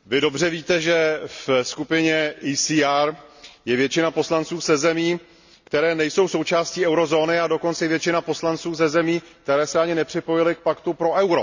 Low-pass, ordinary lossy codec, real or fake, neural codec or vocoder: 7.2 kHz; none; real; none